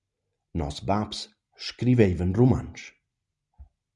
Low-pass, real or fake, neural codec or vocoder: 10.8 kHz; real; none